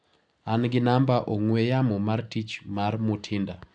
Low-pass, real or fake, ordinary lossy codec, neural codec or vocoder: 9.9 kHz; real; none; none